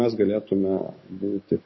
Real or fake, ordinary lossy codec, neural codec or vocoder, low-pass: fake; MP3, 24 kbps; codec, 44.1 kHz, 7.8 kbps, DAC; 7.2 kHz